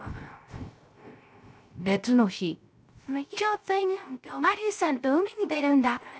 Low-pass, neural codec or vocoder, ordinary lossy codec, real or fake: none; codec, 16 kHz, 0.3 kbps, FocalCodec; none; fake